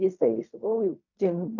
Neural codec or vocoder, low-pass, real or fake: codec, 16 kHz in and 24 kHz out, 0.4 kbps, LongCat-Audio-Codec, fine tuned four codebook decoder; 7.2 kHz; fake